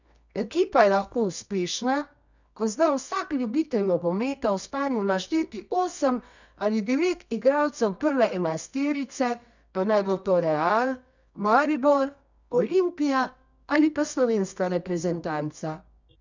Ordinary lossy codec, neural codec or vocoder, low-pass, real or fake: none; codec, 24 kHz, 0.9 kbps, WavTokenizer, medium music audio release; 7.2 kHz; fake